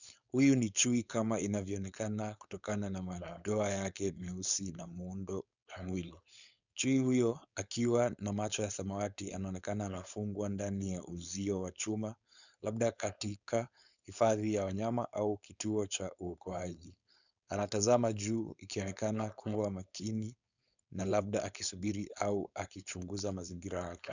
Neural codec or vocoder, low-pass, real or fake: codec, 16 kHz, 4.8 kbps, FACodec; 7.2 kHz; fake